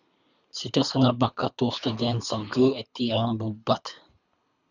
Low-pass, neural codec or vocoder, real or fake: 7.2 kHz; codec, 24 kHz, 3 kbps, HILCodec; fake